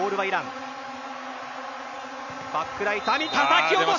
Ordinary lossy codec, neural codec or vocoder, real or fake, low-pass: none; none; real; 7.2 kHz